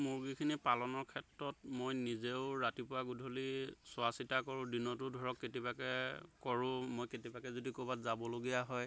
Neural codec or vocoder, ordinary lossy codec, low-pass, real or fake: none; none; none; real